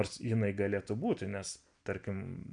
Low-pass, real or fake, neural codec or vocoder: 9.9 kHz; real; none